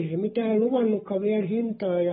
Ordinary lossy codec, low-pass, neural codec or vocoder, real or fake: AAC, 16 kbps; 19.8 kHz; codec, 44.1 kHz, 7.8 kbps, Pupu-Codec; fake